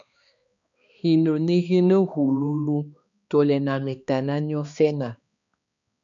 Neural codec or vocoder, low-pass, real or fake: codec, 16 kHz, 2 kbps, X-Codec, HuBERT features, trained on balanced general audio; 7.2 kHz; fake